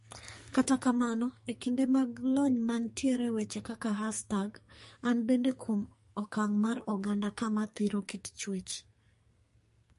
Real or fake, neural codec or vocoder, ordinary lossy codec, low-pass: fake; codec, 44.1 kHz, 2.6 kbps, SNAC; MP3, 48 kbps; 14.4 kHz